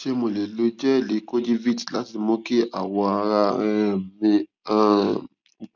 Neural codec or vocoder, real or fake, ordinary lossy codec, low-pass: none; real; none; 7.2 kHz